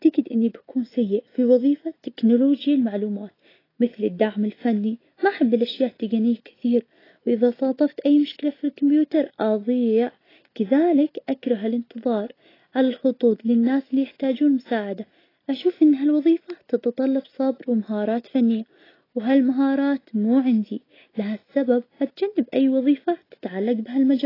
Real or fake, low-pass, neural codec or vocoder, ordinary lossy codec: real; 5.4 kHz; none; AAC, 24 kbps